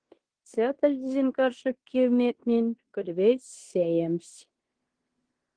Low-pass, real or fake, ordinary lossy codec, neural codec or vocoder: 9.9 kHz; fake; Opus, 16 kbps; codec, 16 kHz in and 24 kHz out, 0.9 kbps, LongCat-Audio-Codec, fine tuned four codebook decoder